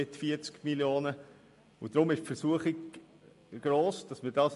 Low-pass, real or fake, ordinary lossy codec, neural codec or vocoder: 14.4 kHz; real; MP3, 48 kbps; none